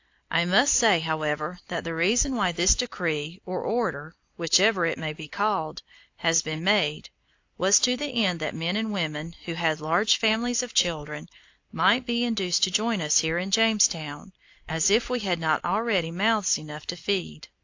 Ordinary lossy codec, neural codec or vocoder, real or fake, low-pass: AAC, 48 kbps; none; real; 7.2 kHz